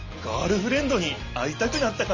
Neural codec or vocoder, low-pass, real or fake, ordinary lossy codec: none; 7.2 kHz; real; Opus, 32 kbps